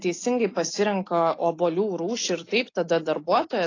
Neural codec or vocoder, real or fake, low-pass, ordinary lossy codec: none; real; 7.2 kHz; AAC, 32 kbps